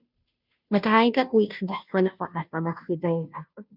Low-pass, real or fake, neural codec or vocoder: 5.4 kHz; fake; codec, 16 kHz, 0.5 kbps, FunCodec, trained on Chinese and English, 25 frames a second